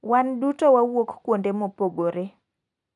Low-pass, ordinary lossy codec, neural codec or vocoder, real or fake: 10.8 kHz; none; none; real